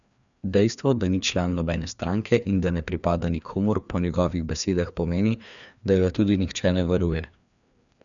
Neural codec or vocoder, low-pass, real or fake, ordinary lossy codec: codec, 16 kHz, 2 kbps, FreqCodec, larger model; 7.2 kHz; fake; none